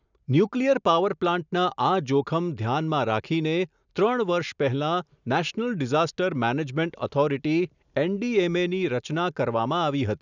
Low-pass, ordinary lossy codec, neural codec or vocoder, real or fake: 7.2 kHz; none; none; real